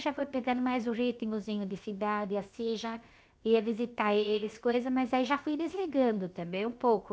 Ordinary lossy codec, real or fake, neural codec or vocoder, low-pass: none; fake; codec, 16 kHz, 0.7 kbps, FocalCodec; none